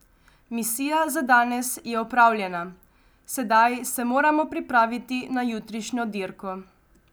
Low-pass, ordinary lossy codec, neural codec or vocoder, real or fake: none; none; none; real